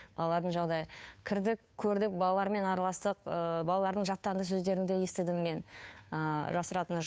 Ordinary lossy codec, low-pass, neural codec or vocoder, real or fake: none; none; codec, 16 kHz, 2 kbps, FunCodec, trained on Chinese and English, 25 frames a second; fake